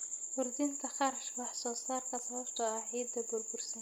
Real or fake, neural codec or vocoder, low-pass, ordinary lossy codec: real; none; none; none